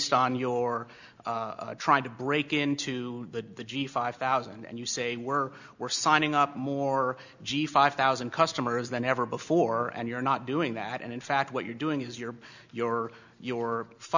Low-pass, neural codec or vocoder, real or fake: 7.2 kHz; none; real